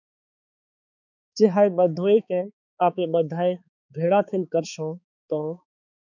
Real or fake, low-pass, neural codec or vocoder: fake; 7.2 kHz; codec, 16 kHz, 4 kbps, X-Codec, HuBERT features, trained on balanced general audio